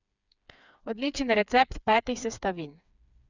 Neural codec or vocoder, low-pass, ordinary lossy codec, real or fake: codec, 16 kHz, 4 kbps, FreqCodec, smaller model; 7.2 kHz; none; fake